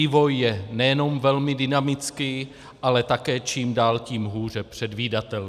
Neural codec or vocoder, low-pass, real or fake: none; 14.4 kHz; real